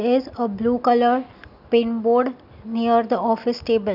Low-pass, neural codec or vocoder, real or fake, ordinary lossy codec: 5.4 kHz; none; real; none